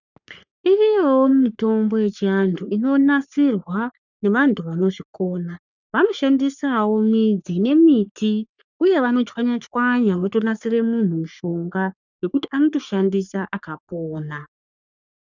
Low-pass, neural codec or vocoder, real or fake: 7.2 kHz; codec, 44.1 kHz, 3.4 kbps, Pupu-Codec; fake